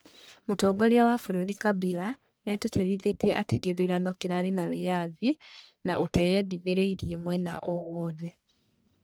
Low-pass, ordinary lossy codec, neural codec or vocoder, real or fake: none; none; codec, 44.1 kHz, 1.7 kbps, Pupu-Codec; fake